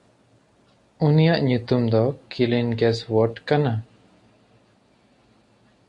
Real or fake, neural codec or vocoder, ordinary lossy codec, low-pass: real; none; MP3, 64 kbps; 10.8 kHz